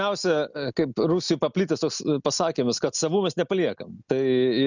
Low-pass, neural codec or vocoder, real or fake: 7.2 kHz; none; real